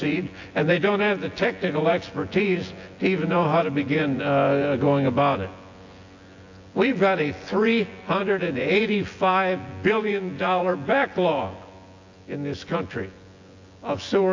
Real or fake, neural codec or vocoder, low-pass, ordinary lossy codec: fake; vocoder, 24 kHz, 100 mel bands, Vocos; 7.2 kHz; AAC, 48 kbps